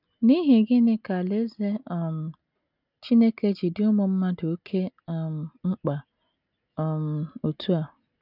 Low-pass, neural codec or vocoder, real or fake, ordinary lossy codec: 5.4 kHz; none; real; none